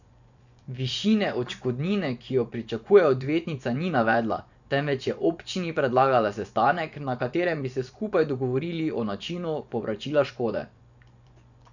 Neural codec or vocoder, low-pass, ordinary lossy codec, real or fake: vocoder, 24 kHz, 100 mel bands, Vocos; 7.2 kHz; none; fake